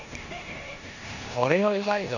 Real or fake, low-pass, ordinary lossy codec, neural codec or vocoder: fake; 7.2 kHz; none; codec, 16 kHz in and 24 kHz out, 0.9 kbps, LongCat-Audio-Codec, four codebook decoder